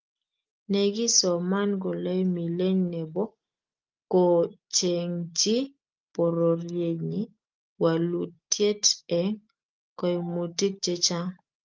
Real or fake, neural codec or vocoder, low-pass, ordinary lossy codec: real; none; 7.2 kHz; Opus, 32 kbps